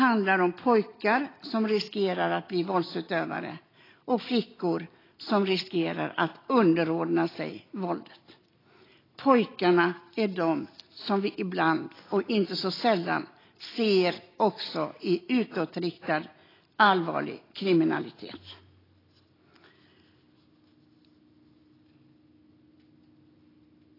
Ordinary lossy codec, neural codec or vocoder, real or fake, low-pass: AAC, 24 kbps; none; real; 5.4 kHz